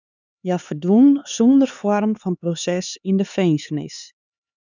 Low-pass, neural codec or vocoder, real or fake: 7.2 kHz; codec, 16 kHz, 4 kbps, X-Codec, HuBERT features, trained on LibriSpeech; fake